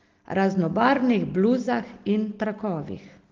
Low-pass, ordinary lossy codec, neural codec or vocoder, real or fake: 7.2 kHz; Opus, 16 kbps; none; real